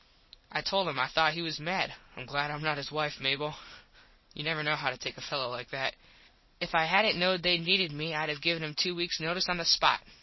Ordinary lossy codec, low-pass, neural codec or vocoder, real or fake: MP3, 24 kbps; 7.2 kHz; none; real